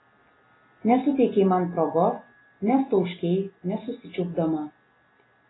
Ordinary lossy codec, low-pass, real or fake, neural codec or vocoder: AAC, 16 kbps; 7.2 kHz; real; none